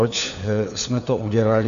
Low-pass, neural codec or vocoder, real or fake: 7.2 kHz; codec, 16 kHz, 4 kbps, FunCodec, trained on LibriTTS, 50 frames a second; fake